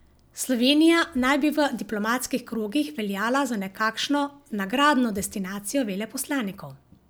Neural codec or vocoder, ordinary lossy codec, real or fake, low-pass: none; none; real; none